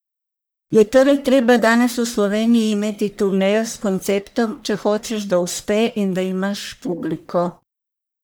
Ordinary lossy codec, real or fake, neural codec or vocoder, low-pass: none; fake; codec, 44.1 kHz, 1.7 kbps, Pupu-Codec; none